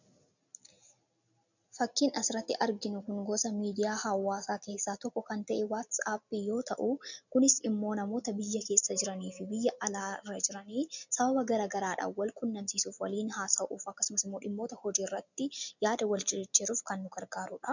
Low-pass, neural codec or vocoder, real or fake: 7.2 kHz; none; real